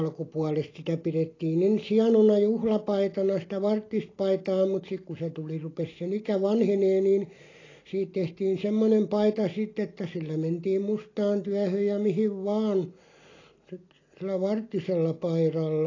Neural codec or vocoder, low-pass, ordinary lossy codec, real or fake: none; 7.2 kHz; AAC, 32 kbps; real